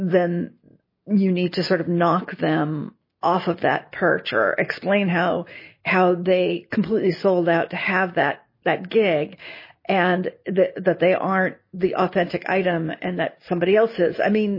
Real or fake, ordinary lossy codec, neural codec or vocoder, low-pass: real; MP3, 24 kbps; none; 5.4 kHz